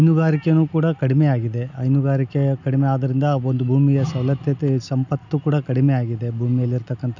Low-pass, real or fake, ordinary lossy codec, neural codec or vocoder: 7.2 kHz; real; none; none